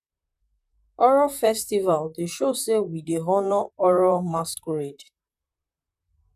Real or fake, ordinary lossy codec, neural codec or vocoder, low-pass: fake; none; vocoder, 44.1 kHz, 128 mel bands, Pupu-Vocoder; 14.4 kHz